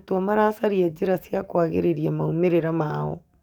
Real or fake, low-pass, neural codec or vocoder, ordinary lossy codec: fake; 19.8 kHz; codec, 44.1 kHz, 7.8 kbps, DAC; none